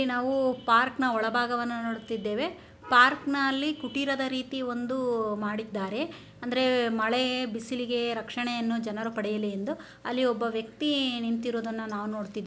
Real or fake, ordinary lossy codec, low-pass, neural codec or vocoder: real; none; none; none